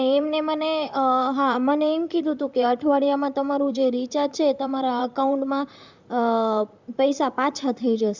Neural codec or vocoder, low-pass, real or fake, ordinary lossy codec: vocoder, 44.1 kHz, 128 mel bands, Pupu-Vocoder; 7.2 kHz; fake; Opus, 64 kbps